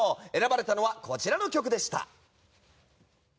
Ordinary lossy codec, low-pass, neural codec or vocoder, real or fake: none; none; none; real